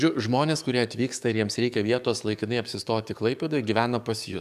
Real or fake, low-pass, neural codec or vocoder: fake; 14.4 kHz; codec, 44.1 kHz, 7.8 kbps, DAC